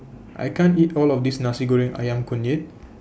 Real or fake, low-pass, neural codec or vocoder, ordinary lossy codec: real; none; none; none